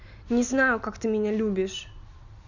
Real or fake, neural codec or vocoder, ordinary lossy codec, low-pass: real; none; none; 7.2 kHz